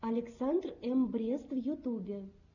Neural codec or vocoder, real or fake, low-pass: none; real; 7.2 kHz